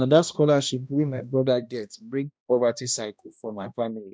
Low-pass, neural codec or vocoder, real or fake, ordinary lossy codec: none; codec, 16 kHz, 1 kbps, X-Codec, HuBERT features, trained on balanced general audio; fake; none